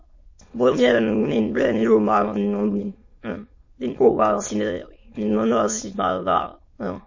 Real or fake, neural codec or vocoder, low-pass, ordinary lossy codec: fake; autoencoder, 22.05 kHz, a latent of 192 numbers a frame, VITS, trained on many speakers; 7.2 kHz; MP3, 32 kbps